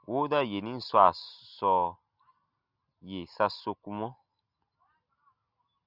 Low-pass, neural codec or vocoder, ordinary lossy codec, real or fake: 5.4 kHz; none; Opus, 64 kbps; real